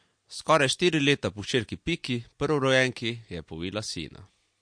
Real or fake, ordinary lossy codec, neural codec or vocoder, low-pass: real; MP3, 48 kbps; none; 9.9 kHz